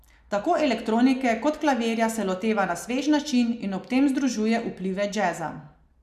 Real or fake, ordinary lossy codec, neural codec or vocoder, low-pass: real; none; none; 14.4 kHz